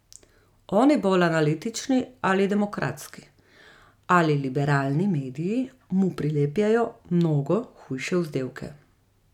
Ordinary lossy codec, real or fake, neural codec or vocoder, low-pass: none; real; none; 19.8 kHz